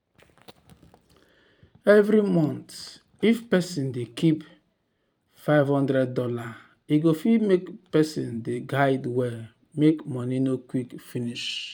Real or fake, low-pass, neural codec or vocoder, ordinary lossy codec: fake; 19.8 kHz; vocoder, 44.1 kHz, 128 mel bands every 512 samples, BigVGAN v2; none